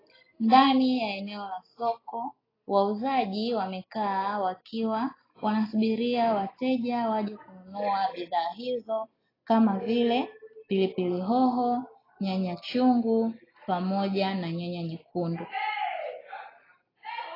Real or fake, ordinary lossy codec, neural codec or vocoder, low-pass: real; AAC, 24 kbps; none; 5.4 kHz